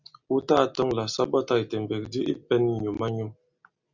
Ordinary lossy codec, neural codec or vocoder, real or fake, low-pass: Opus, 64 kbps; none; real; 7.2 kHz